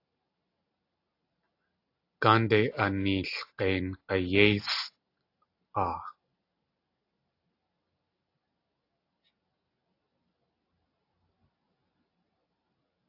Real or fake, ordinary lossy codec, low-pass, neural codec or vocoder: real; AAC, 32 kbps; 5.4 kHz; none